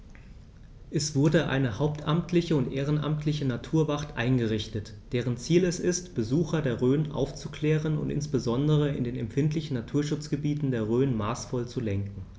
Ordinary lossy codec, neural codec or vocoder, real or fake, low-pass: none; none; real; none